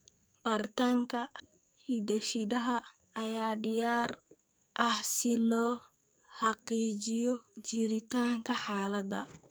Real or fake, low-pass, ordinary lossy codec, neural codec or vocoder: fake; none; none; codec, 44.1 kHz, 2.6 kbps, SNAC